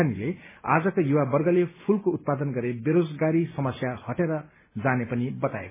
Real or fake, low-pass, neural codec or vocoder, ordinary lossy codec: real; 3.6 kHz; none; MP3, 16 kbps